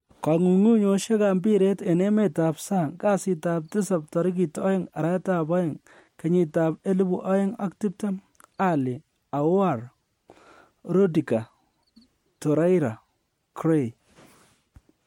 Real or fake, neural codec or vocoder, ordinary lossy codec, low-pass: real; none; MP3, 64 kbps; 19.8 kHz